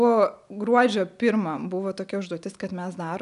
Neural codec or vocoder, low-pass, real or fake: none; 10.8 kHz; real